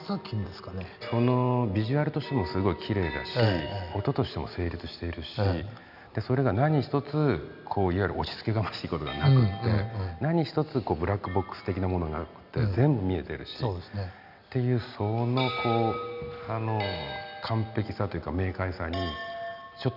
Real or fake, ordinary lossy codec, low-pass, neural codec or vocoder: real; none; 5.4 kHz; none